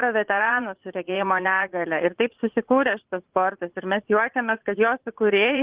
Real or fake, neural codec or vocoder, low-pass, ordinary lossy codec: fake; vocoder, 44.1 kHz, 80 mel bands, Vocos; 3.6 kHz; Opus, 16 kbps